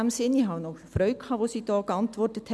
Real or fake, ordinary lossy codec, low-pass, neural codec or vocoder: real; none; none; none